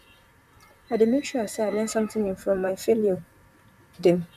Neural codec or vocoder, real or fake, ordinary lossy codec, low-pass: vocoder, 44.1 kHz, 128 mel bands, Pupu-Vocoder; fake; none; 14.4 kHz